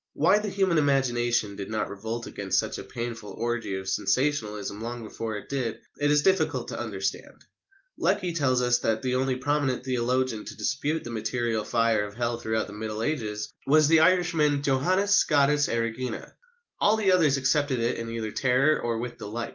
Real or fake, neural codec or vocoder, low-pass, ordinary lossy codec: real; none; 7.2 kHz; Opus, 24 kbps